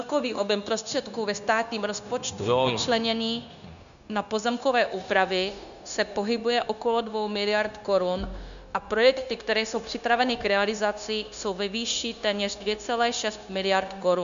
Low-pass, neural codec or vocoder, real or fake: 7.2 kHz; codec, 16 kHz, 0.9 kbps, LongCat-Audio-Codec; fake